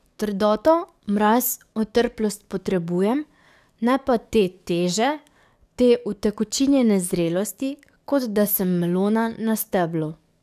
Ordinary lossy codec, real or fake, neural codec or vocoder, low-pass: none; fake; codec, 44.1 kHz, 7.8 kbps, DAC; 14.4 kHz